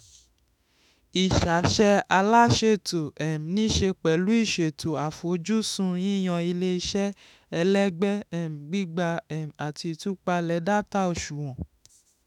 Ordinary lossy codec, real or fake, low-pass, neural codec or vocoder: none; fake; 19.8 kHz; autoencoder, 48 kHz, 32 numbers a frame, DAC-VAE, trained on Japanese speech